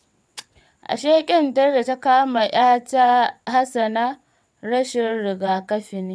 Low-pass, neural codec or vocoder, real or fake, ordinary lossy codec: none; vocoder, 22.05 kHz, 80 mel bands, WaveNeXt; fake; none